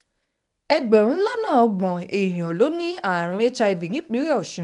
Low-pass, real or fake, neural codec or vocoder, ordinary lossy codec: 10.8 kHz; fake; codec, 24 kHz, 0.9 kbps, WavTokenizer, medium speech release version 1; none